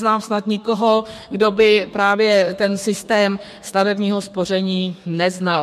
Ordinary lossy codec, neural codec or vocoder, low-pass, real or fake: MP3, 64 kbps; codec, 32 kHz, 1.9 kbps, SNAC; 14.4 kHz; fake